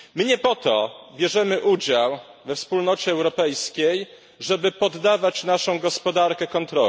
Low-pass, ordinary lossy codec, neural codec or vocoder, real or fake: none; none; none; real